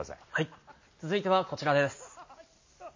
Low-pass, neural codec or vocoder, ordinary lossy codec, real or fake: 7.2 kHz; none; MP3, 32 kbps; real